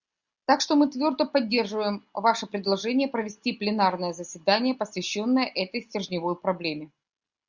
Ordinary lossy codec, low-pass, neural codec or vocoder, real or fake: Opus, 64 kbps; 7.2 kHz; none; real